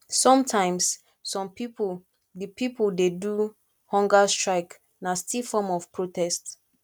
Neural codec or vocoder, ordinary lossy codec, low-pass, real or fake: none; none; 19.8 kHz; real